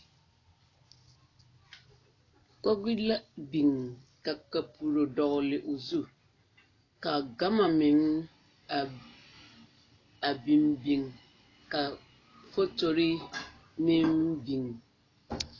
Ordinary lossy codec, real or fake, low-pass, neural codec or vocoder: AAC, 32 kbps; real; 7.2 kHz; none